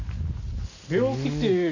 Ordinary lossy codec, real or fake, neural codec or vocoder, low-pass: none; real; none; 7.2 kHz